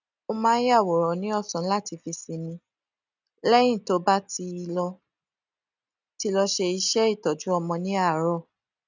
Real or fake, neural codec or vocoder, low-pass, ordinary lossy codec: real; none; 7.2 kHz; none